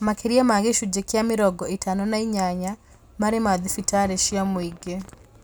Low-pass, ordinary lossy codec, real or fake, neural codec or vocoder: none; none; real; none